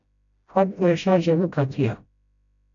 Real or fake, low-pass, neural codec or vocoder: fake; 7.2 kHz; codec, 16 kHz, 0.5 kbps, FreqCodec, smaller model